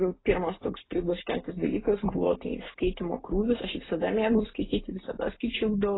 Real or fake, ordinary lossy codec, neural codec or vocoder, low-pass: fake; AAC, 16 kbps; vocoder, 44.1 kHz, 128 mel bands every 256 samples, BigVGAN v2; 7.2 kHz